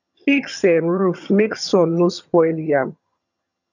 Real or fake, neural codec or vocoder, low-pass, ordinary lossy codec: fake; vocoder, 22.05 kHz, 80 mel bands, HiFi-GAN; 7.2 kHz; none